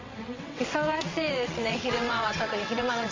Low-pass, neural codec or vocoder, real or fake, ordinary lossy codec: 7.2 kHz; vocoder, 22.05 kHz, 80 mel bands, WaveNeXt; fake; MP3, 48 kbps